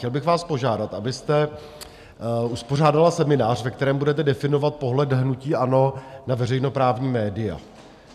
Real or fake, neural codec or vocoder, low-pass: real; none; 14.4 kHz